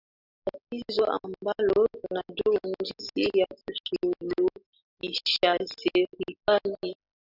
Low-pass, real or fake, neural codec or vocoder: 5.4 kHz; real; none